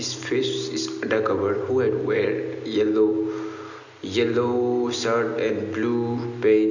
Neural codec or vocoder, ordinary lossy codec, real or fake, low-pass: none; none; real; 7.2 kHz